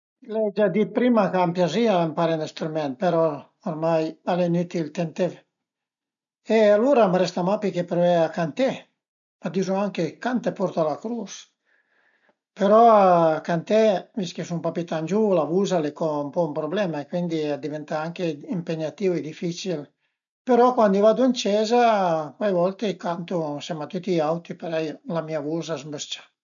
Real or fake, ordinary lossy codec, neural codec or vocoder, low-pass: real; none; none; 7.2 kHz